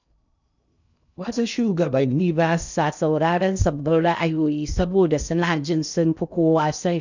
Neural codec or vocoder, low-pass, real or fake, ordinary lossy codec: codec, 16 kHz in and 24 kHz out, 0.6 kbps, FocalCodec, streaming, 2048 codes; 7.2 kHz; fake; none